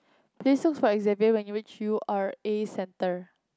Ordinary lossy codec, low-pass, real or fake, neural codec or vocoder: none; none; real; none